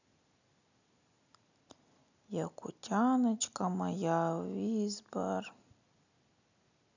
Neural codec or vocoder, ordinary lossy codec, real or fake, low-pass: none; none; real; 7.2 kHz